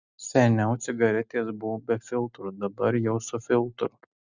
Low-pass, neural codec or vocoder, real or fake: 7.2 kHz; none; real